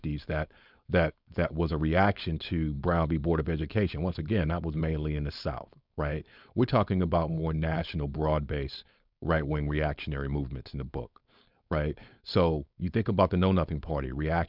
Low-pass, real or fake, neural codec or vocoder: 5.4 kHz; fake; codec, 16 kHz, 4.8 kbps, FACodec